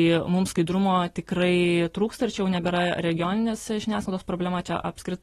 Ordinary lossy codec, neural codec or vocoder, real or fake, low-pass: AAC, 32 kbps; none; real; 19.8 kHz